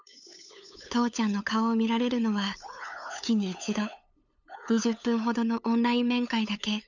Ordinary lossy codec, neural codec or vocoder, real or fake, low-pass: none; codec, 16 kHz, 8 kbps, FunCodec, trained on LibriTTS, 25 frames a second; fake; 7.2 kHz